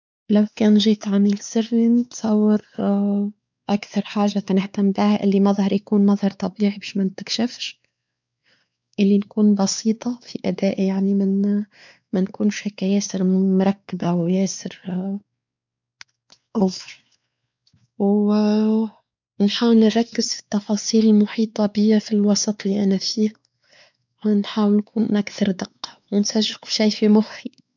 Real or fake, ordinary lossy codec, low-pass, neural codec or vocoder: fake; none; 7.2 kHz; codec, 16 kHz, 4 kbps, X-Codec, WavLM features, trained on Multilingual LibriSpeech